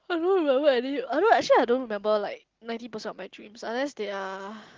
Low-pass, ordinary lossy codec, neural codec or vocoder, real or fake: 7.2 kHz; Opus, 16 kbps; none; real